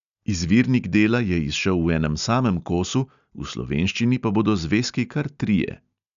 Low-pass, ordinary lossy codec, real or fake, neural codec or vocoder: 7.2 kHz; none; real; none